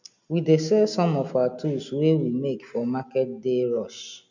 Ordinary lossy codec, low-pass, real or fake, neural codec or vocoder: none; 7.2 kHz; real; none